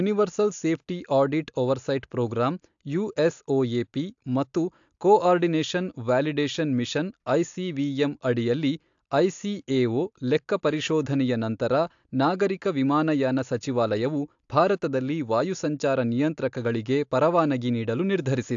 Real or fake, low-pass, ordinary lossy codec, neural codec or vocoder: real; 7.2 kHz; AAC, 64 kbps; none